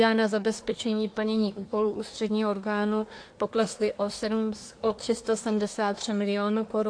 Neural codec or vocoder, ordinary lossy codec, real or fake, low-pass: codec, 24 kHz, 1 kbps, SNAC; AAC, 48 kbps; fake; 9.9 kHz